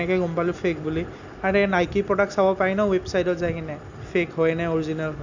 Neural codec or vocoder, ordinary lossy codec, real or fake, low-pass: none; none; real; 7.2 kHz